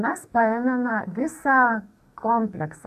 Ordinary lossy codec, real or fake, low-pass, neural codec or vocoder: AAC, 96 kbps; fake; 14.4 kHz; codec, 44.1 kHz, 2.6 kbps, SNAC